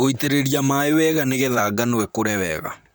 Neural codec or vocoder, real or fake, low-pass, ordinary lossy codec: vocoder, 44.1 kHz, 128 mel bands, Pupu-Vocoder; fake; none; none